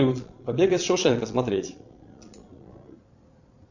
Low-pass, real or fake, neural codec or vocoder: 7.2 kHz; fake; vocoder, 22.05 kHz, 80 mel bands, Vocos